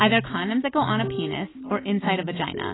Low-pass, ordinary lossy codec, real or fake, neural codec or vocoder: 7.2 kHz; AAC, 16 kbps; real; none